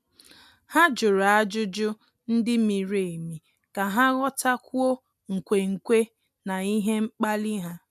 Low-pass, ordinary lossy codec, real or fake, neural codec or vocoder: 14.4 kHz; MP3, 96 kbps; real; none